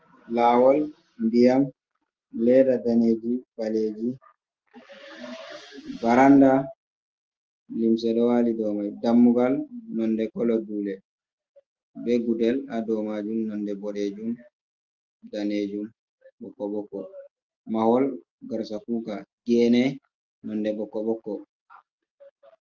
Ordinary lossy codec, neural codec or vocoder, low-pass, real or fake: Opus, 24 kbps; none; 7.2 kHz; real